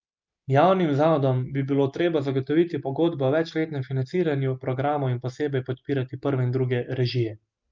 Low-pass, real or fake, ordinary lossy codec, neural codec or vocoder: 7.2 kHz; real; Opus, 32 kbps; none